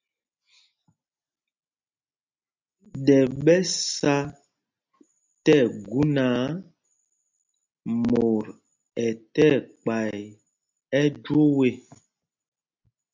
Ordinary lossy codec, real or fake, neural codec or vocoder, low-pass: MP3, 64 kbps; real; none; 7.2 kHz